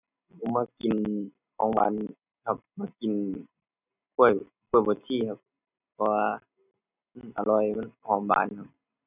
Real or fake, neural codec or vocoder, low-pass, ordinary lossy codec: real; none; 3.6 kHz; none